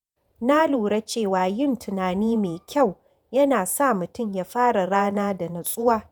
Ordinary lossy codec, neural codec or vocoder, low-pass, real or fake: none; vocoder, 48 kHz, 128 mel bands, Vocos; none; fake